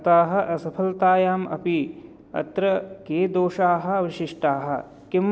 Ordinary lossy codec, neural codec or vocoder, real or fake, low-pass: none; none; real; none